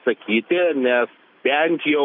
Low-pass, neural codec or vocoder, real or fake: 5.4 kHz; none; real